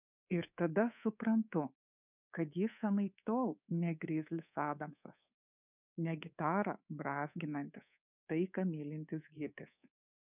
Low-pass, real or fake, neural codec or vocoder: 3.6 kHz; fake; codec, 24 kHz, 3.1 kbps, DualCodec